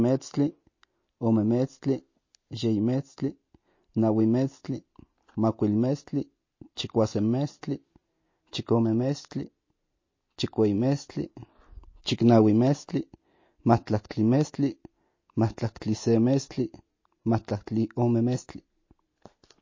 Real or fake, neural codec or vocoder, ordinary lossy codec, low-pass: real; none; MP3, 32 kbps; 7.2 kHz